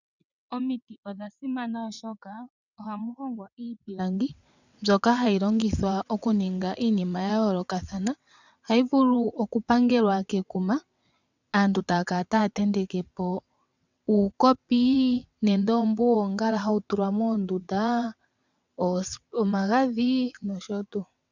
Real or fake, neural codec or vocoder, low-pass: fake; vocoder, 22.05 kHz, 80 mel bands, WaveNeXt; 7.2 kHz